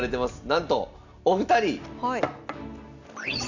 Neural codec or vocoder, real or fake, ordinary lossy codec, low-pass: none; real; none; 7.2 kHz